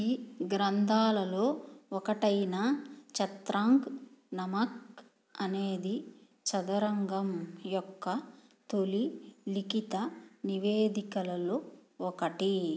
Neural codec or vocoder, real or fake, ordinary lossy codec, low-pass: none; real; none; none